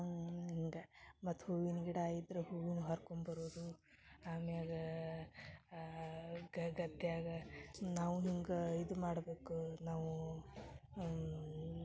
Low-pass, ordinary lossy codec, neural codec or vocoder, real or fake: none; none; none; real